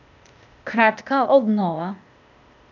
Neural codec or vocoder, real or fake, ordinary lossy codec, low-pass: codec, 16 kHz, 0.8 kbps, ZipCodec; fake; none; 7.2 kHz